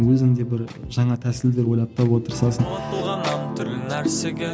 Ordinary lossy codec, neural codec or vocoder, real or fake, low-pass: none; none; real; none